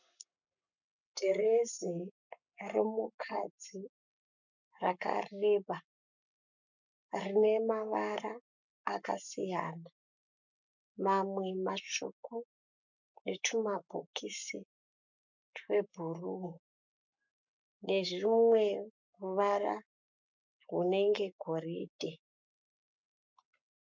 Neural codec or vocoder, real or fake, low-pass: codec, 44.1 kHz, 7.8 kbps, Pupu-Codec; fake; 7.2 kHz